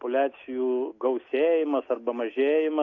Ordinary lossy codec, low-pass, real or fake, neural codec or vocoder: AAC, 48 kbps; 7.2 kHz; real; none